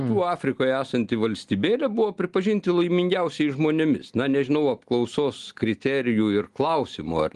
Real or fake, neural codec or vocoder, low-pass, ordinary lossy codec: real; none; 10.8 kHz; Opus, 24 kbps